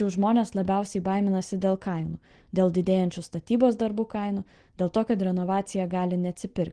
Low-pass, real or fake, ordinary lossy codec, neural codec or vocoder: 9.9 kHz; real; Opus, 16 kbps; none